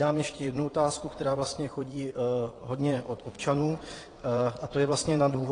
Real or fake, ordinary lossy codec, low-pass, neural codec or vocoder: fake; AAC, 32 kbps; 9.9 kHz; vocoder, 22.05 kHz, 80 mel bands, Vocos